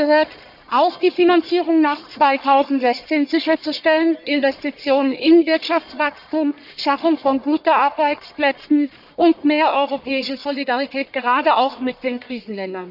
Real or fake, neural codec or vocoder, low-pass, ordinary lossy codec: fake; codec, 44.1 kHz, 1.7 kbps, Pupu-Codec; 5.4 kHz; none